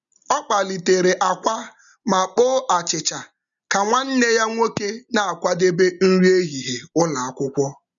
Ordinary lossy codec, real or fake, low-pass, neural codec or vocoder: none; real; 7.2 kHz; none